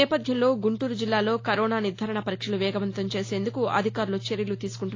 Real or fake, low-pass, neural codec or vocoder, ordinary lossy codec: real; 7.2 kHz; none; AAC, 32 kbps